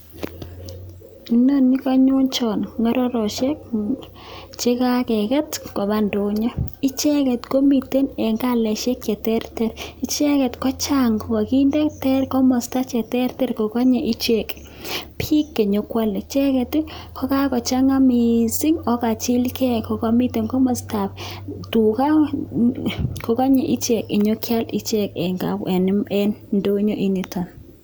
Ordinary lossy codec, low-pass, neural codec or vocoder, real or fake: none; none; none; real